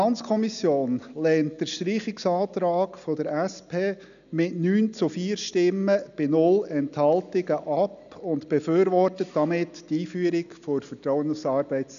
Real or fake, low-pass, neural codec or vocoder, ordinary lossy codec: real; 7.2 kHz; none; none